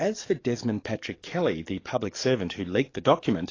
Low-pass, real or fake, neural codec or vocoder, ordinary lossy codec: 7.2 kHz; fake; codec, 44.1 kHz, 7.8 kbps, DAC; AAC, 32 kbps